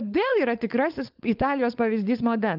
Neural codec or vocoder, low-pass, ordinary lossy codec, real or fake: codec, 16 kHz, 4.8 kbps, FACodec; 5.4 kHz; Opus, 24 kbps; fake